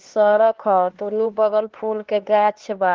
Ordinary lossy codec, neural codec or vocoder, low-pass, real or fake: Opus, 16 kbps; codec, 16 kHz, 2 kbps, X-Codec, HuBERT features, trained on LibriSpeech; 7.2 kHz; fake